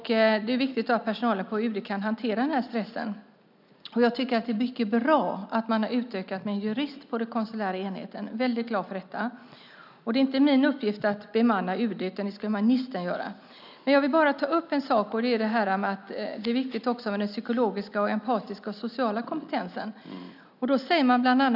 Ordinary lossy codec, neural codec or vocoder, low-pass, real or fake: none; none; 5.4 kHz; real